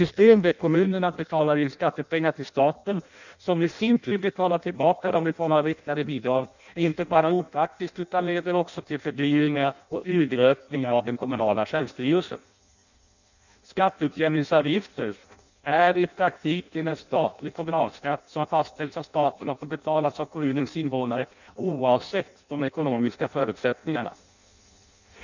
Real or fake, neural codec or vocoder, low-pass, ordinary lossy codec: fake; codec, 16 kHz in and 24 kHz out, 0.6 kbps, FireRedTTS-2 codec; 7.2 kHz; none